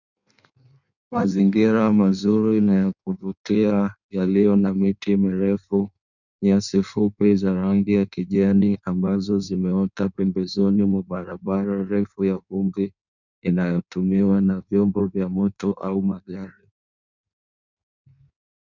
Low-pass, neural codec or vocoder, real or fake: 7.2 kHz; codec, 16 kHz in and 24 kHz out, 1.1 kbps, FireRedTTS-2 codec; fake